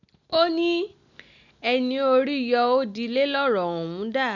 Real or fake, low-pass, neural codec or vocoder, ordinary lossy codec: real; 7.2 kHz; none; none